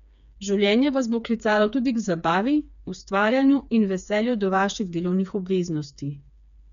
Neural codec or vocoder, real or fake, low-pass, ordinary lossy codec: codec, 16 kHz, 4 kbps, FreqCodec, smaller model; fake; 7.2 kHz; none